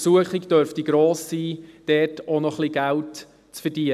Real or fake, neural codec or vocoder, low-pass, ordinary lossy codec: real; none; 14.4 kHz; none